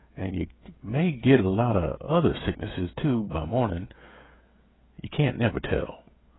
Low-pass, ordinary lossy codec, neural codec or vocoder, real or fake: 7.2 kHz; AAC, 16 kbps; codec, 16 kHz, 16 kbps, FreqCodec, smaller model; fake